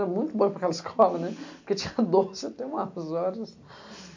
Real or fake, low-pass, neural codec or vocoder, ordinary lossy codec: real; 7.2 kHz; none; MP3, 48 kbps